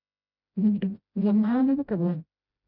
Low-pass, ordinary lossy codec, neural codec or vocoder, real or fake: 5.4 kHz; none; codec, 16 kHz, 0.5 kbps, FreqCodec, smaller model; fake